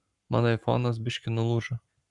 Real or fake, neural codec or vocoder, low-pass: fake; codec, 44.1 kHz, 7.8 kbps, Pupu-Codec; 10.8 kHz